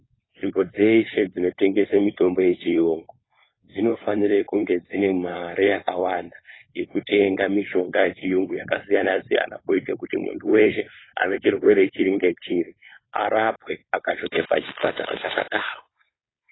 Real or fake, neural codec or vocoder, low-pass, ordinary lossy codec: fake; codec, 16 kHz, 4.8 kbps, FACodec; 7.2 kHz; AAC, 16 kbps